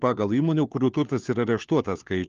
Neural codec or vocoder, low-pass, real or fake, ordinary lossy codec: codec, 16 kHz, 4 kbps, FunCodec, trained on LibriTTS, 50 frames a second; 7.2 kHz; fake; Opus, 32 kbps